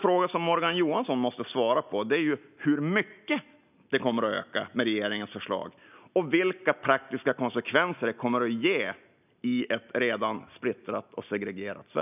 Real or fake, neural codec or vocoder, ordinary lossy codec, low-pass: real; none; none; 3.6 kHz